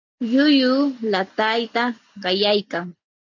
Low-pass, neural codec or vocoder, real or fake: 7.2 kHz; none; real